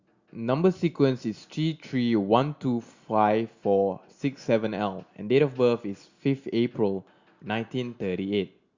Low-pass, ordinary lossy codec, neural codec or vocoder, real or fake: 7.2 kHz; Opus, 64 kbps; none; real